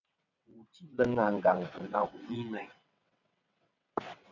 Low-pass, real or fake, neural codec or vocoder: 7.2 kHz; fake; vocoder, 22.05 kHz, 80 mel bands, WaveNeXt